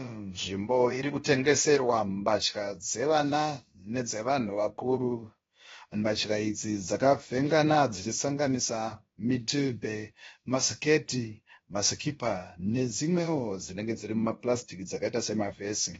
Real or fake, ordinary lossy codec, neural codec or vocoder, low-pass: fake; AAC, 24 kbps; codec, 16 kHz, about 1 kbps, DyCAST, with the encoder's durations; 7.2 kHz